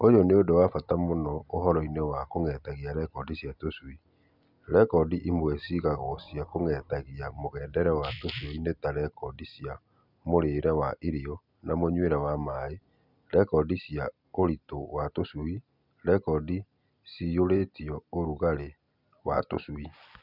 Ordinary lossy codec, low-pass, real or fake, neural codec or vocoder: none; 5.4 kHz; real; none